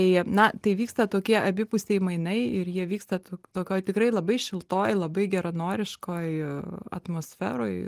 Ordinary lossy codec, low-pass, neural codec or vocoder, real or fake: Opus, 24 kbps; 14.4 kHz; none; real